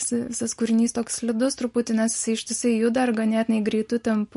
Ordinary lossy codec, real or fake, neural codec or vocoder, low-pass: MP3, 48 kbps; real; none; 14.4 kHz